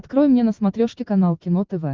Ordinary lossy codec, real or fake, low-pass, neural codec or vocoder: Opus, 32 kbps; real; 7.2 kHz; none